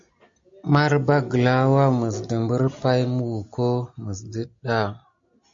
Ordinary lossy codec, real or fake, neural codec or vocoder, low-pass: MP3, 48 kbps; real; none; 7.2 kHz